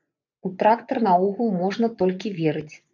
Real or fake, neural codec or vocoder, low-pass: fake; vocoder, 24 kHz, 100 mel bands, Vocos; 7.2 kHz